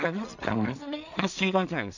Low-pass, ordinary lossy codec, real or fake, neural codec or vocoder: 7.2 kHz; none; fake; codec, 16 kHz in and 24 kHz out, 0.4 kbps, LongCat-Audio-Codec, two codebook decoder